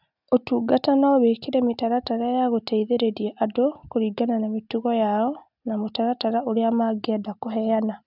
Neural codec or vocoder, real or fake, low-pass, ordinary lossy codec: none; real; 5.4 kHz; none